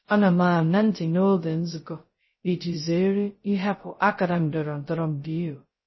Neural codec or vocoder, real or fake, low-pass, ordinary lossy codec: codec, 16 kHz, 0.2 kbps, FocalCodec; fake; 7.2 kHz; MP3, 24 kbps